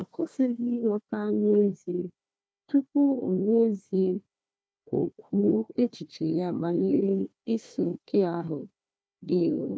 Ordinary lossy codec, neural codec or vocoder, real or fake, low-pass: none; codec, 16 kHz, 1 kbps, FunCodec, trained on Chinese and English, 50 frames a second; fake; none